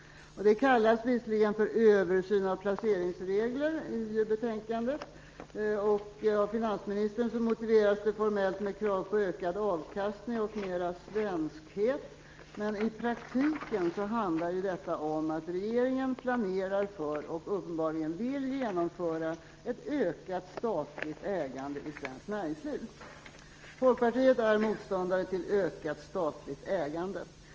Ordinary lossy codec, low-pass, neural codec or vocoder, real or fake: Opus, 24 kbps; 7.2 kHz; none; real